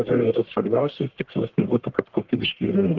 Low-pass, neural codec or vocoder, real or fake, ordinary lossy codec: 7.2 kHz; codec, 44.1 kHz, 1.7 kbps, Pupu-Codec; fake; Opus, 16 kbps